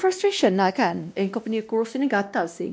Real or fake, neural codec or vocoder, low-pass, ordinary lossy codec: fake; codec, 16 kHz, 1 kbps, X-Codec, WavLM features, trained on Multilingual LibriSpeech; none; none